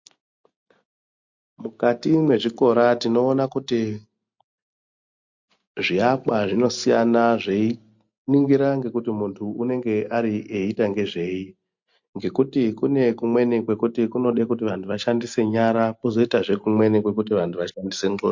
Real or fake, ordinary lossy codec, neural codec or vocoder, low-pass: real; MP3, 48 kbps; none; 7.2 kHz